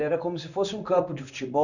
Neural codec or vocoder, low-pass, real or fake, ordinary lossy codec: codec, 16 kHz in and 24 kHz out, 1 kbps, XY-Tokenizer; 7.2 kHz; fake; none